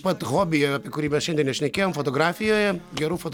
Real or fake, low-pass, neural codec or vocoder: real; 19.8 kHz; none